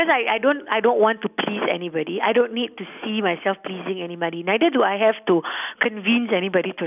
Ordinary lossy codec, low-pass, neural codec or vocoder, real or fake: none; 3.6 kHz; none; real